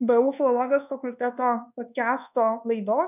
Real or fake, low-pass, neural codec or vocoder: fake; 3.6 kHz; codec, 24 kHz, 1.2 kbps, DualCodec